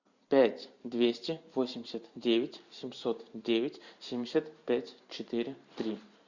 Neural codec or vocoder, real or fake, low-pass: vocoder, 44.1 kHz, 128 mel bands every 512 samples, BigVGAN v2; fake; 7.2 kHz